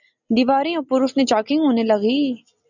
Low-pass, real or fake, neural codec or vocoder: 7.2 kHz; real; none